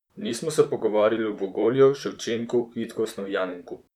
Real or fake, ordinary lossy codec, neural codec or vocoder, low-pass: fake; none; vocoder, 44.1 kHz, 128 mel bands, Pupu-Vocoder; 19.8 kHz